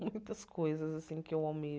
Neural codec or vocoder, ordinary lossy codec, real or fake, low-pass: none; none; real; none